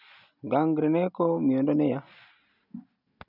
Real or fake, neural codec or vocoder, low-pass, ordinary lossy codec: real; none; 5.4 kHz; none